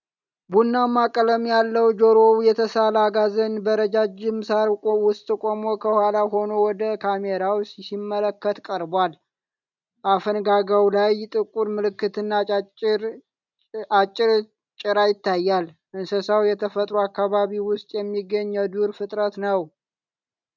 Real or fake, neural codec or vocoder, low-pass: real; none; 7.2 kHz